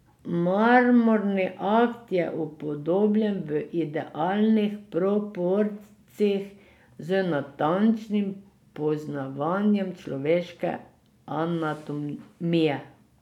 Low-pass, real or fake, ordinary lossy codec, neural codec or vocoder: 19.8 kHz; real; none; none